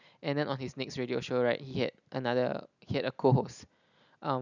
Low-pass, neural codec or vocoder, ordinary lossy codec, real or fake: 7.2 kHz; none; none; real